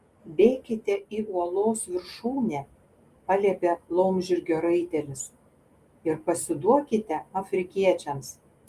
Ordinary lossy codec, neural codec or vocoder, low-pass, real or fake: Opus, 32 kbps; none; 14.4 kHz; real